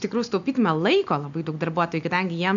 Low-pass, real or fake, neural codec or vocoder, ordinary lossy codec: 7.2 kHz; real; none; AAC, 96 kbps